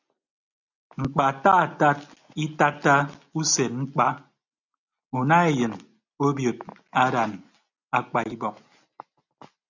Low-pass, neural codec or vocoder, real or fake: 7.2 kHz; none; real